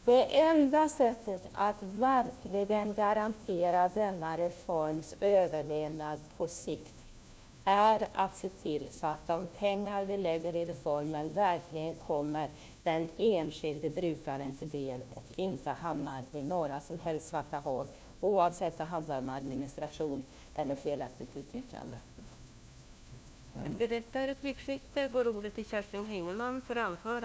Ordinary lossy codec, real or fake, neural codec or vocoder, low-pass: none; fake; codec, 16 kHz, 1 kbps, FunCodec, trained on LibriTTS, 50 frames a second; none